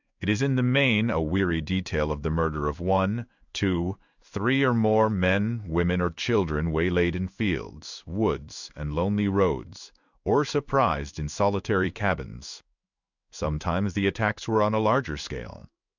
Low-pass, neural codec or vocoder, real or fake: 7.2 kHz; codec, 16 kHz in and 24 kHz out, 1 kbps, XY-Tokenizer; fake